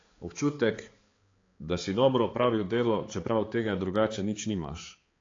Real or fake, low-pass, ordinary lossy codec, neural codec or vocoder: fake; 7.2 kHz; AAC, 32 kbps; codec, 16 kHz, 4 kbps, X-Codec, HuBERT features, trained on balanced general audio